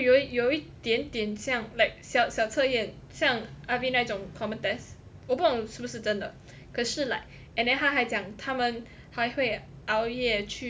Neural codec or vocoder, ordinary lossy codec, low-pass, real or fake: none; none; none; real